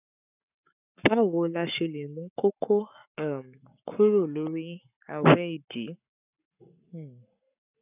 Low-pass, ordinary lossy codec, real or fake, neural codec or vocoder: 3.6 kHz; none; fake; codec, 44.1 kHz, 7.8 kbps, Pupu-Codec